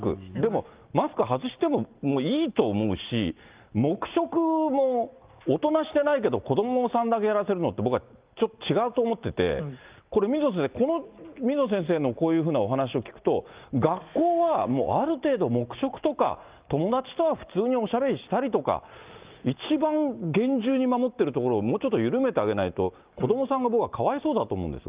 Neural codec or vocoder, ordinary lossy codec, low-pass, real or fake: none; Opus, 32 kbps; 3.6 kHz; real